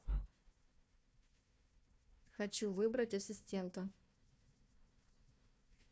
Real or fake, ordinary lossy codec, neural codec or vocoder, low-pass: fake; none; codec, 16 kHz, 1 kbps, FunCodec, trained on Chinese and English, 50 frames a second; none